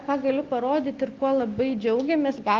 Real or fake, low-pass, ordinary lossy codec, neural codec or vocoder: real; 7.2 kHz; Opus, 24 kbps; none